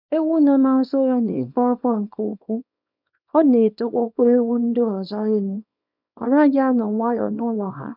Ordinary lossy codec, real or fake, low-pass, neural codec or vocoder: none; fake; 5.4 kHz; codec, 24 kHz, 0.9 kbps, WavTokenizer, small release